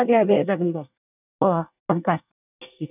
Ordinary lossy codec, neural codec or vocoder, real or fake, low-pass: none; codec, 24 kHz, 1 kbps, SNAC; fake; 3.6 kHz